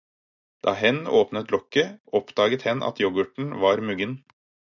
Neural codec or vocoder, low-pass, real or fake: none; 7.2 kHz; real